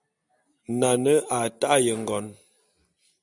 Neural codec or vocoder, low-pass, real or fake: none; 10.8 kHz; real